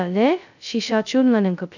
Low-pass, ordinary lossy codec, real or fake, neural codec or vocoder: 7.2 kHz; none; fake; codec, 16 kHz, 0.2 kbps, FocalCodec